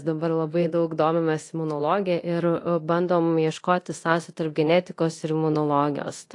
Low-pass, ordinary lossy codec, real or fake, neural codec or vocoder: 10.8 kHz; AAC, 48 kbps; fake; codec, 24 kHz, 0.9 kbps, DualCodec